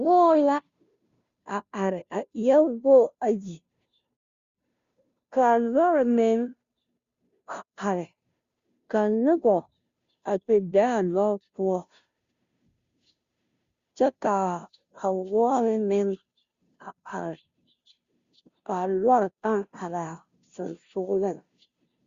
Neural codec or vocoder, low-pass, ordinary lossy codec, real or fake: codec, 16 kHz, 0.5 kbps, FunCodec, trained on Chinese and English, 25 frames a second; 7.2 kHz; Opus, 64 kbps; fake